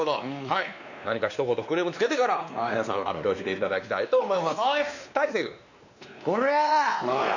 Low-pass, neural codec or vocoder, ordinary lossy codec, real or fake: 7.2 kHz; codec, 16 kHz, 2 kbps, X-Codec, WavLM features, trained on Multilingual LibriSpeech; none; fake